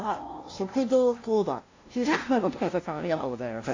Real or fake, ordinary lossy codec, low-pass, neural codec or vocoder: fake; AAC, 32 kbps; 7.2 kHz; codec, 16 kHz, 0.5 kbps, FunCodec, trained on LibriTTS, 25 frames a second